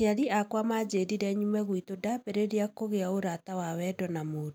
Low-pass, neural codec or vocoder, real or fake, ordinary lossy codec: none; none; real; none